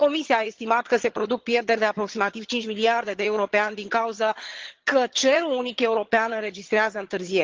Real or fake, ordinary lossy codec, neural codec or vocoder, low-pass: fake; Opus, 16 kbps; vocoder, 22.05 kHz, 80 mel bands, HiFi-GAN; 7.2 kHz